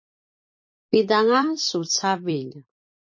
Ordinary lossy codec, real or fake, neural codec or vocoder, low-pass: MP3, 32 kbps; fake; vocoder, 44.1 kHz, 128 mel bands every 256 samples, BigVGAN v2; 7.2 kHz